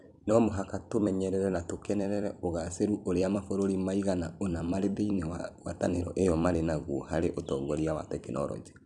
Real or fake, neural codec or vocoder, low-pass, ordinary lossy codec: fake; vocoder, 44.1 kHz, 128 mel bands every 256 samples, BigVGAN v2; 10.8 kHz; none